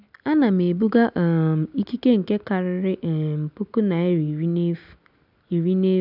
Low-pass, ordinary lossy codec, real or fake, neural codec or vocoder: 5.4 kHz; none; real; none